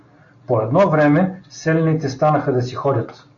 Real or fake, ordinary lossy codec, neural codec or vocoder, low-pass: real; AAC, 48 kbps; none; 7.2 kHz